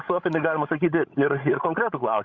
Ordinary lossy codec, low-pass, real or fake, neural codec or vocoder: Opus, 64 kbps; 7.2 kHz; fake; codec, 16 kHz, 16 kbps, FreqCodec, larger model